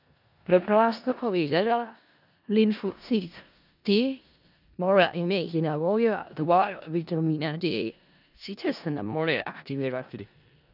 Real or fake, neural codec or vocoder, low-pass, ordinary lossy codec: fake; codec, 16 kHz in and 24 kHz out, 0.4 kbps, LongCat-Audio-Codec, four codebook decoder; 5.4 kHz; none